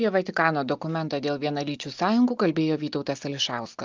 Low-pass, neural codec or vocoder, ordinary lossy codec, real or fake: 7.2 kHz; none; Opus, 24 kbps; real